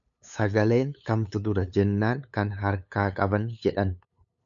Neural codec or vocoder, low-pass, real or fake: codec, 16 kHz, 8 kbps, FunCodec, trained on LibriTTS, 25 frames a second; 7.2 kHz; fake